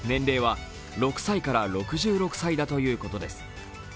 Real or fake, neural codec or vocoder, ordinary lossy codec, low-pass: real; none; none; none